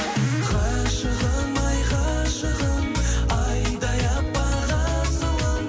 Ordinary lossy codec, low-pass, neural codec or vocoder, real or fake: none; none; none; real